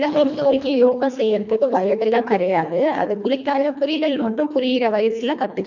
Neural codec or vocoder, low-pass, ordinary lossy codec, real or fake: codec, 24 kHz, 1.5 kbps, HILCodec; 7.2 kHz; none; fake